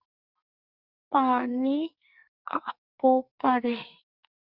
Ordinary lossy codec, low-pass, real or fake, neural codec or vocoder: Opus, 64 kbps; 5.4 kHz; fake; codec, 44.1 kHz, 2.6 kbps, SNAC